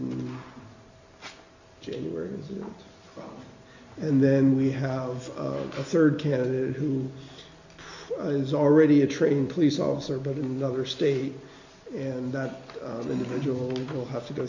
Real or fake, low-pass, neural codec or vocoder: real; 7.2 kHz; none